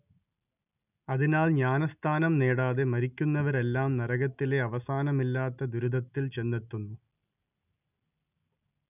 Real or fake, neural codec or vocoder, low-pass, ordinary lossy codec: real; none; 3.6 kHz; none